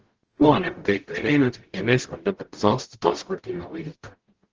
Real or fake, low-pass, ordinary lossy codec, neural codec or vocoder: fake; 7.2 kHz; Opus, 16 kbps; codec, 44.1 kHz, 0.9 kbps, DAC